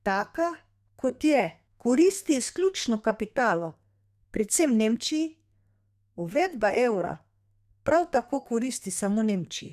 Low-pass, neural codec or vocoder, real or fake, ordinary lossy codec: 14.4 kHz; codec, 32 kHz, 1.9 kbps, SNAC; fake; none